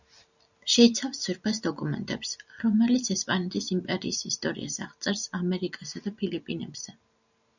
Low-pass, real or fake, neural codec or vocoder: 7.2 kHz; real; none